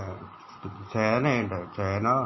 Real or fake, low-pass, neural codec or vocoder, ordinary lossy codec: real; 7.2 kHz; none; MP3, 24 kbps